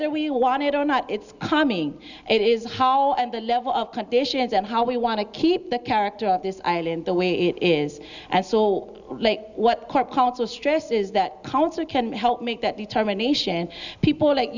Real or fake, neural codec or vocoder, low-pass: real; none; 7.2 kHz